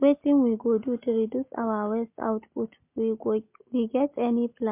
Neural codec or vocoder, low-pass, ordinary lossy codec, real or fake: none; 3.6 kHz; MP3, 32 kbps; real